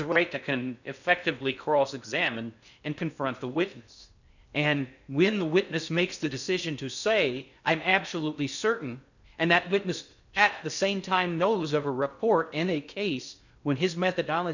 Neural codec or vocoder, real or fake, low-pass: codec, 16 kHz in and 24 kHz out, 0.6 kbps, FocalCodec, streaming, 4096 codes; fake; 7.2 kHz